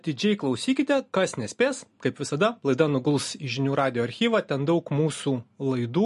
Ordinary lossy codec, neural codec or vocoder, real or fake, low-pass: MP3, 48 kbps; none; real; 14.4 kHz